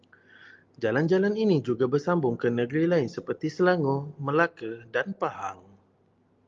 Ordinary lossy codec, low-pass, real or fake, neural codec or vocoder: Opus, 32 kbps; 7.2 kHz; real; none